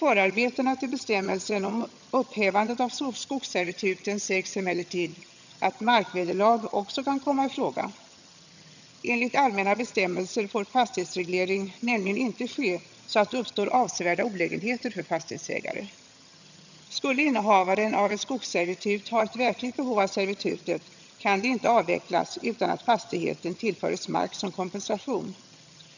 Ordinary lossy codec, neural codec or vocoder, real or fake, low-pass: none; vocoder, 22.05 kHz, 80 mel bands, HiFi-GAN; fake; 7.2 kHz